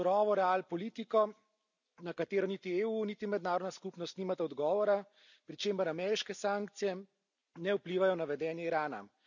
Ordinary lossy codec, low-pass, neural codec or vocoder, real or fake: none; 7.2 kHz; none; real